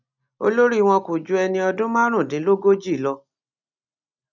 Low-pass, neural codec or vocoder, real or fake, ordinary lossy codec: 7.2 kHz; none; real; none